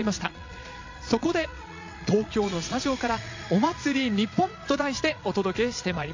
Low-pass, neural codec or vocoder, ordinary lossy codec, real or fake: 7.2 kHz; vocoder, 22.05 kHz, 80 mel bands, Vocos; AAC, 48 kbps; fake